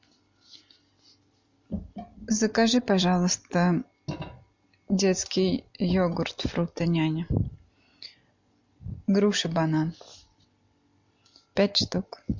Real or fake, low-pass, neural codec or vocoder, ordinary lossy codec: real; 7.2 kHz; none; MP3, 48 kbps